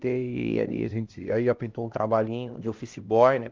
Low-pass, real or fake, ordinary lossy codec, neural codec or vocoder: 7.2 kHz; fake; Opus, 32 kbps; codec, 16 kHz, 1 kbps, X-Codec, HuBERT features, trained on LibriSpeech